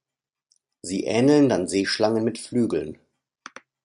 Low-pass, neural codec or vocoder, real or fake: 10.8 kHz; none; real